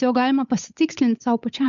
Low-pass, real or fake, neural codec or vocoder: 7.2 kHz; fake; codec, 16 kHz, 8 kbps, FunCodec, trained on Chinese and English, 25 frames a second